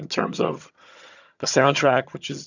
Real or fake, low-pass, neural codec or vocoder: fake; 7.2 kHz; vocoder, 22.05 kHz, 80 mel bands, HiFi-GAN